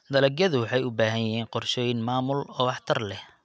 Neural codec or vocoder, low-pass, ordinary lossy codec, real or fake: none; none; none; real